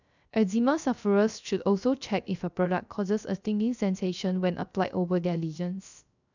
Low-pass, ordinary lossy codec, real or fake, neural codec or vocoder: 7.2 kHz; none; fake; codec, 16 kHz, 0.3 kbps, FocalCodec